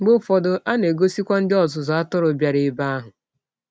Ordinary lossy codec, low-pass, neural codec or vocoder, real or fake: none; none; none; real